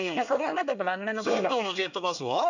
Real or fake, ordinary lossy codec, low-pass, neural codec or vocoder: fake; none; 7.2 kHz; codec, 24 kHz, 1 kbps, SNAC